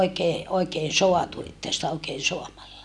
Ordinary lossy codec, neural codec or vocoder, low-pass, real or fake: none; none; none; real